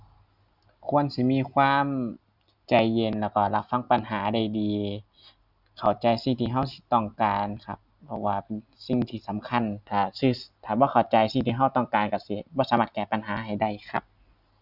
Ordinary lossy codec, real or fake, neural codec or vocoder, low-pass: Opus, 64 kbps; real; none; 5.4 kHz